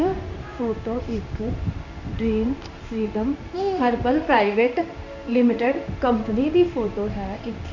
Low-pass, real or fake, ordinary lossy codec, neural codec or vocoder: 7.2 kHz; fake; none; codec, 16 kHz in and 24 kHz out, 1 kbps, XY-Tokenizer